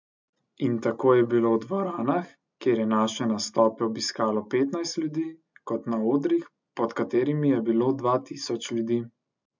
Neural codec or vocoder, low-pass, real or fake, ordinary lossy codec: none; 7.2 kHz; real; MP3, 64 kbps